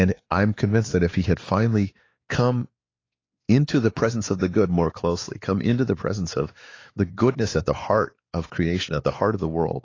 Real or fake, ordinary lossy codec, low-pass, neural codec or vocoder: fake; AAC, 32 kbps; 7.2 kHz; codec, 16 kHz, 4 kbps, X-Codec, WavLM features, trained on Multilingual LibriSpeech